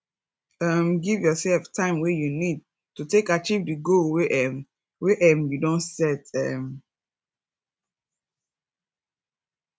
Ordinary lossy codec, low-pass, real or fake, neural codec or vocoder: none; none; real; none